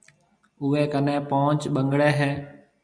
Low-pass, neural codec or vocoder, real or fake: 9.9 kHz; none; real